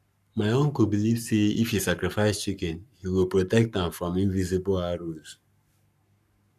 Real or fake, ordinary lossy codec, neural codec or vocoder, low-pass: fake; none; codec, 44.1 kHz, 7.8 kbps, Pupu-Codec; 14.4 kHz